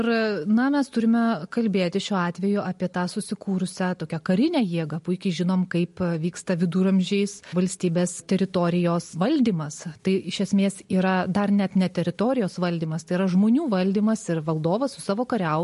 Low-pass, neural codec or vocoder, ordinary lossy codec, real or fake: 14.4 kHz; none; MP3, 48 kbps; real